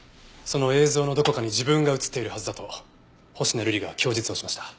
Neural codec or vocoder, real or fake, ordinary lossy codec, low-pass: none; real; none; none